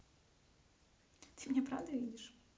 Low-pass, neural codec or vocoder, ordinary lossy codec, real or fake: none; none; none; real